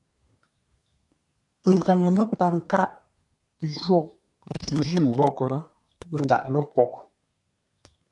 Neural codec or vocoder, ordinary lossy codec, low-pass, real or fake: codec, 24 kHz, 1 kbps, SNAC; AAC, 64 kbps; 10.8 kHz; fake